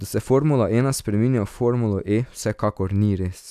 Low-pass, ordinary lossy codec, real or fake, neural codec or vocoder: 14.4 kHz; none; real; none